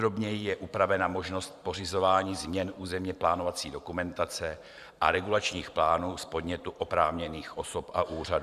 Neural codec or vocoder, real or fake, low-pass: vocoder, 44.1 kHz, 128 mel bands every 512 samples, BigVGAN v2; fake; 14.4 kHz